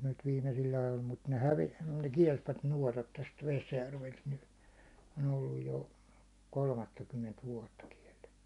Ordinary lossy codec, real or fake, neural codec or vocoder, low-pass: none; real; none; 10.8 kHz